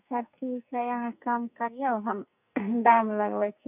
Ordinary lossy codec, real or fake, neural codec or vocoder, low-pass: none; fake; codec, 44.1 kHz, 2.6 kbps, SNAC; 3.6 kHz